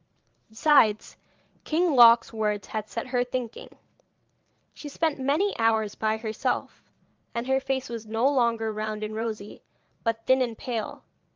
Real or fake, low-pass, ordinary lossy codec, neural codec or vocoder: fake; 7.2 kHz; Opus, 24 kbps; vocoder, 22.05 kHz, 80 mel bands, Vocos